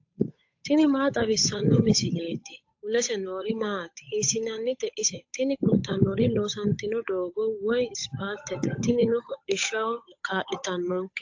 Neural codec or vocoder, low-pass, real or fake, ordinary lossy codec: codec, 16 kHz, 8 kbps, FunCodec, trained on Chinese and English, 25 frames a second; 7.2 kHz; fake; AAC, 48 kbps